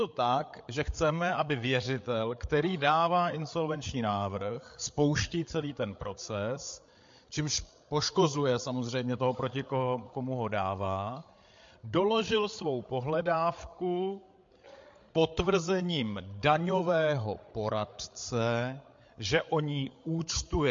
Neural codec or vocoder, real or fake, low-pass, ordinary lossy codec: codec, 16 kHz, 8 kbps, FreqCodec, larger model; fake; 7.2 kHz; MP3, 48 kbps